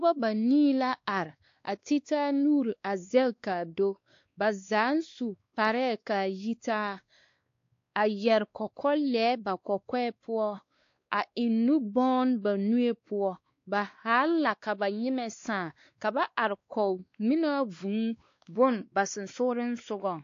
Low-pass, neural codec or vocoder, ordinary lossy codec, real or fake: 7.2 kHz; codec, 16 kHz, 2 kbps, X-Codec, WavLM features, trained on Multilingual LibriSpeech; AAC, 48 kbps; fake